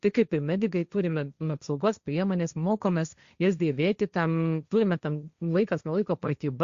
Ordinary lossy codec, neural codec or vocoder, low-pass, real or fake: Opus, 64 kbps; codec, 16 kHz, 1.1 kbps, Voila-Tokenizer; 7.2 kHz; fake